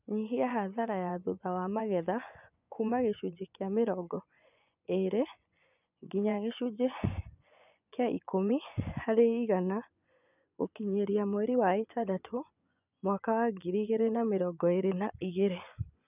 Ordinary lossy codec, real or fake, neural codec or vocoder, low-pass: none; fake; vocoder, 24 kHz, 100 mel bands, Vocos; 3.6 kHz